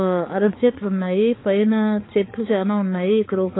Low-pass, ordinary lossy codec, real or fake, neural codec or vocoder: 7.2 kHz; AAC, 16 kbps; fake; codec, 16 kHz, 4 kbps, X-Codec, HuBERT features, trained on balanced general audio